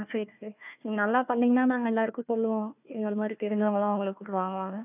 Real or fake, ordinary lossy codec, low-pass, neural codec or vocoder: fake; none; 3.6 kHz; codec, 16 kHz, 1 kbps, FunCodec, trained on Chinese and English, 50 frames a second